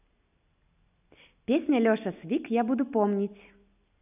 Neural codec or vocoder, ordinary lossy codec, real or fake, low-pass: none; none; real; 3.6 kHz